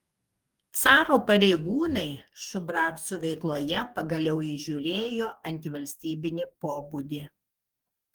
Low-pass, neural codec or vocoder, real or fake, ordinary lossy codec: 19.8 kHz; codec, 44.1 kHz, 2.6 kbps, DAC; fake; Opus, 32 kbps